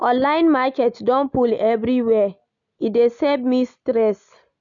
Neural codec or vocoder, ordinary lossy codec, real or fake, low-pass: none; none; real; 7.2 kHz